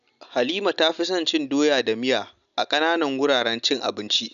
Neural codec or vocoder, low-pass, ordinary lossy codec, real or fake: none; 7.2 kHz; none; real